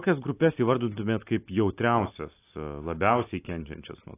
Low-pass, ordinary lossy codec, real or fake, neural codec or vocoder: 3.6 kHz; AAC, 24 kbps; real; none